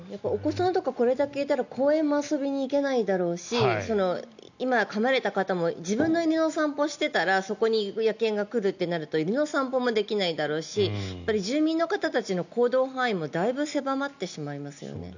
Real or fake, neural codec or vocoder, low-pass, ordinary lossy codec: real; none; 7.2 kHz; none